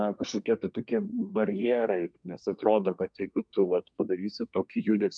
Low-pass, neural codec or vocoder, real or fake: 9.9 kHz; codec, 24 kHz, 1 kbps, SNAC; fake